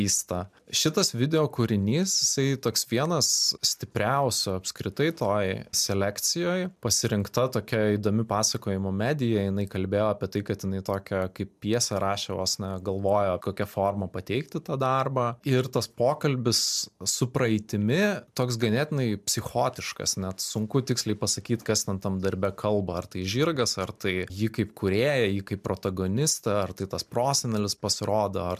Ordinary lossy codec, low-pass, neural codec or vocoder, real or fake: MP3, 96 kbps; 14.4 kHz; none; real